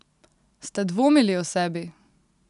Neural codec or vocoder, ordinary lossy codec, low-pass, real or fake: none; none; 10.8 kHz; real